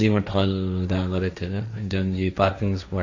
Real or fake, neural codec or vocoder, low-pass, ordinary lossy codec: fake; codec, 16 kHz, 1.1 kbps, Voila-Tokenizer; 7.2 kHz; AAC, 48 kbps